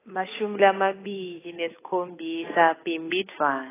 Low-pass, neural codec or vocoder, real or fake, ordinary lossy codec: 3.6 kHz; codec, 16 kHz, 8 kbps, FunCodec, trained on Chinese and English, 25 frames a second; fake; AAC, 16 kbps